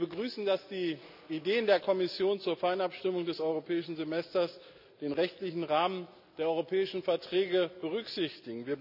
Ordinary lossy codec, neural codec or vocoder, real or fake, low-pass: none; none; real; 5.4 kHz